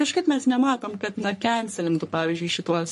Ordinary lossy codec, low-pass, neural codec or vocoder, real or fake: MP3, 48 kbps; 14.4 kHz; codec, 44.1 kHz, 3.4 kbps, Pupu-Codec; fake